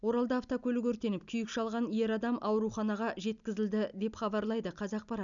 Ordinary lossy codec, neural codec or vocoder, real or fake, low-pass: none; none; real; 7.2 kHz